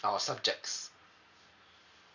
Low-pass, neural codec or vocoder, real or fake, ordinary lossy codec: 7.2 kHz; none; real; none